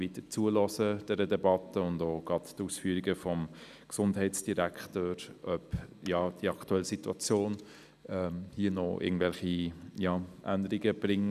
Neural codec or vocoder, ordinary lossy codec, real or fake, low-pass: none; none; real; 14.4 kHz